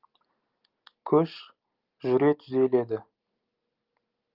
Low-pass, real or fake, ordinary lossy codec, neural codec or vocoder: 5.4 kHz; real; Opus, 32 kbps; none